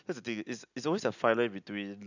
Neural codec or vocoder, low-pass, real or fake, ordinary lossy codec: none; 7.2 kHz; real; none